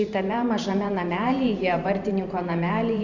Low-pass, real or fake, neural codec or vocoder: 7.2 kHz; fake; vocoder, 44.1 kHz, 128 mel bands every 512 samples, BigVGAN v2